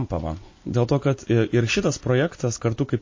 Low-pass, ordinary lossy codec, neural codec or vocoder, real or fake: 7.2 kHz; MP3, 32 kbps; none; real